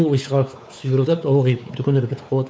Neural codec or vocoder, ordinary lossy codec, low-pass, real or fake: codec, 16 kHz, 4 kbps, X-Codec, WavLM features, trained on Multilingual LibriSpeech; none; none; fake